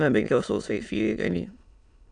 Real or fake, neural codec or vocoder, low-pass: fake; autoencoder, 22.05 kHz, a latent of 192 numbers a frame, VITS, trained on many speakers; 9.9 kHz